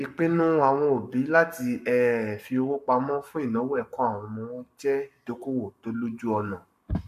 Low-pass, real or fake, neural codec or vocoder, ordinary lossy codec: 14.4 kHz; fake; codec, 44.1 kHz, 7.8 kbps, Pupu-Codec; MP3, 64 kbps